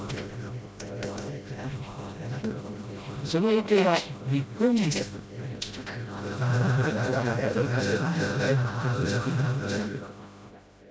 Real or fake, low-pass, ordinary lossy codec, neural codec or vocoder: fake; none; none; codec, 16 kHz, 0.5 kbps, FreqCodec, smaller model